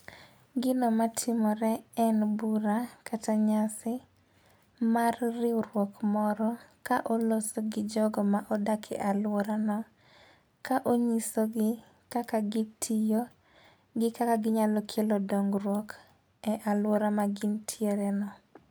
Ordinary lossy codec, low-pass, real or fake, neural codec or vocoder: none; none; real; none